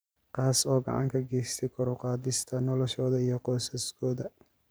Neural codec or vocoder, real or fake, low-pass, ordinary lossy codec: vocoder, 44.1 kHz, 128 mel bands every 512 samples, BigVGAN v2; fake; none; none